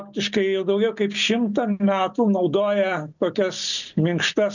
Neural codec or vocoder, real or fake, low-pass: none; real; 7.2 kHz